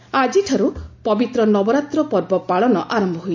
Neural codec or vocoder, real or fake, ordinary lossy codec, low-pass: none; real; MP3, 64 kbps; 7.2 kHz